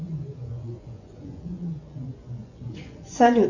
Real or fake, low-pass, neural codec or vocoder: fake; 7.2 kHz; vocoder, 24 kHz, 100 mel bands, Vocos